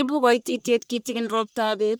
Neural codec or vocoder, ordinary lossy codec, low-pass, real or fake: codec, 44.1 kHz, 1.7 kbps, Pupu-Codec; none; none; fake